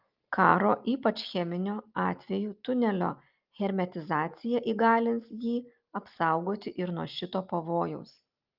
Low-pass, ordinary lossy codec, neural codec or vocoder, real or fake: 5.4 kHz; Opus, 24 kbps; none; real